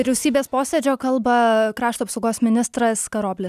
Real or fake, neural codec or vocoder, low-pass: real; none; 14.4 kHz